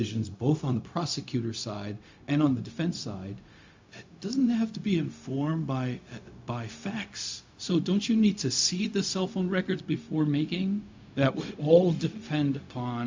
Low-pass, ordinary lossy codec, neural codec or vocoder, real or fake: 7.2 kHz; MP3, 64 kbps; codec, 16 kHz, 0.4 kbps, LongCat-Audio-Codec; fake